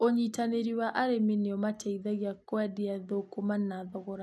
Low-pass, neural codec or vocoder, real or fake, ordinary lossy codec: none; none; real; none